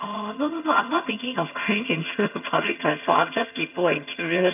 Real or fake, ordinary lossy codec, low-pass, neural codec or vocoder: fake; none; 3.6 kHz; vocoder, 22.05 kHz, 80 mel bands, HiFi-GAN